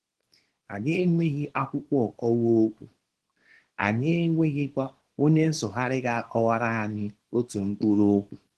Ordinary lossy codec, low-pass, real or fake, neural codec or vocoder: Opus, 16 kbps; 10.8 kHz; fake; codec, 24 kHz, 0.9 kbps, WavTokenizer, small release